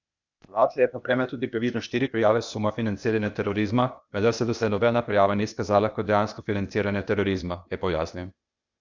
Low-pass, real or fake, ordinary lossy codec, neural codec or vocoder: 7.2 kHz; fake; Opus, 64 kbps; codec, 16 kHz, 0.8 kbps, ZipCodec